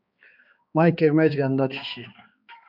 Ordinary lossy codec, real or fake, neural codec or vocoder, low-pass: AAC, 48 kbps; fake; codec, 16 kHz, 2 kbps, X-Codec, HuBERT features, trained on balanced general audio; 5.4 kHz